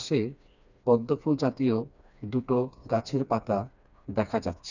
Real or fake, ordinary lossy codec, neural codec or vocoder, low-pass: fake; none; codec, 16 kHz, 2 kbps, FreqCodec, smaller model; 7.2 kHz